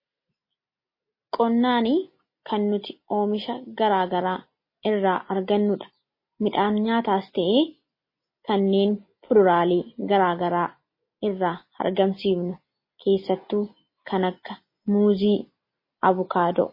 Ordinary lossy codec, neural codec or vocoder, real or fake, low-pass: MP3, 24 kbps; none; real; 5.4 kHz